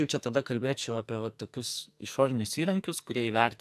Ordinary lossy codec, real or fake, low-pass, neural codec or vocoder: AAC, 96 kbps; fake; 14.4 kHz; codec, 44.1 kHz, 2.6 kbps, SNAC